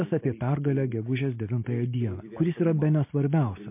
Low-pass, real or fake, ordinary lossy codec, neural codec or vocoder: 3.6 kHz; real; MP3, 24 kbps; none